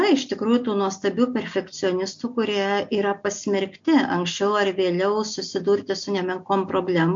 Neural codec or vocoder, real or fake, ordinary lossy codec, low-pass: none; real; MP3, 48 kbps; 7.2 kHz